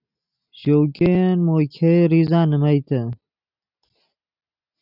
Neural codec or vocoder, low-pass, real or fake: none; 5.4 kHz; real